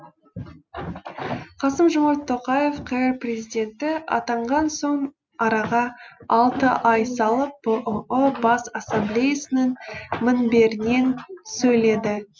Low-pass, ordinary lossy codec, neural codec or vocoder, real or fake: none; none; none; real